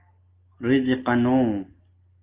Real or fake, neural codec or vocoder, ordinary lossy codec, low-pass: real; none; Opus, 32 kbps; 3.6 kHz